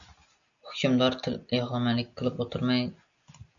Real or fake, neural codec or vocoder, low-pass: real; none; 7.2 kHz